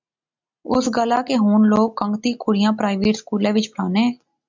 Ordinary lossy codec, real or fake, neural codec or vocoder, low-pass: MP3, 64 kbps; real; none; 7.2 kHz